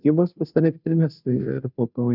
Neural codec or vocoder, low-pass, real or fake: codec, 16 kHz, 0.5 kbps, FunCodec, trained on Chinese and English, 25 frames a second; 5.4 kHz; fake